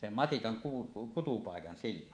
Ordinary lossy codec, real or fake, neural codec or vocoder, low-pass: AAC, 48 kbps; fake; codec, 24 kHz, 3.1 kbps, DualCodec; 9.9 kHz